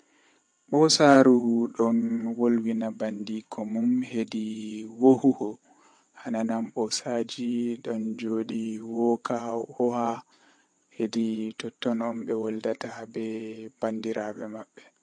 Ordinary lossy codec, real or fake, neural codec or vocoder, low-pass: MP3, 48 kbps; fake; vocoder, 22.05 kHz, 80 mel bands, WaveNeXt; 9.9 kHz